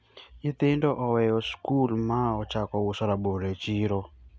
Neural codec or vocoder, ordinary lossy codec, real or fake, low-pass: none; none; real; none